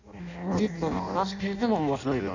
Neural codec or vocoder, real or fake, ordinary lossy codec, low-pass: codec, 16 kHz in and 24 kHz out, 0.6 kbps, FireRedTTS-2 codec; fake; none; 7.2 kHz